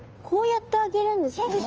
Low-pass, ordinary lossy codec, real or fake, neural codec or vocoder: 7.2 kHz; Opus, 24 kbps; fake; codec, 16 kHz, 2 kbps, FunCodec, trained on Chinese and English, 25 frames a second